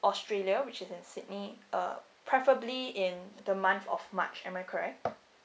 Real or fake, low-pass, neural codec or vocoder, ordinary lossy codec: real; none; none; none